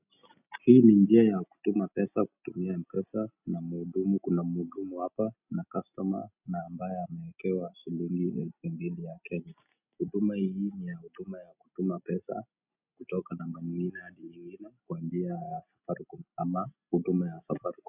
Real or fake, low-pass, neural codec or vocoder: real; 3.6 kHz; none